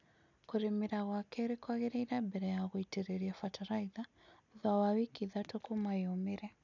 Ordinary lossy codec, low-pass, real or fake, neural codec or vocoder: none; 7.2 kHz; real; none